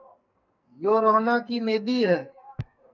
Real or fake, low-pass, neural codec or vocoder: fake; 7.2 kHz; codec, 44.1 kHz, 2.6 kbps, SNAC